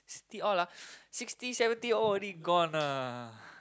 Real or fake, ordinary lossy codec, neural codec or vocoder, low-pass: real; none; none; none